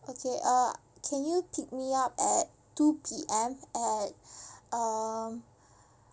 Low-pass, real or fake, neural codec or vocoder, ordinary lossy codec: none; real; none; none